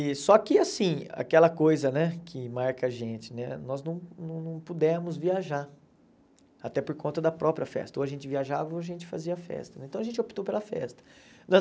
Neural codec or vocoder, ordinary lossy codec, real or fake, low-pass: none; none; real; none